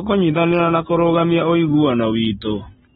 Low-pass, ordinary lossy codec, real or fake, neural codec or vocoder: 19.8 kHz; AAC, 16 kbps; real; none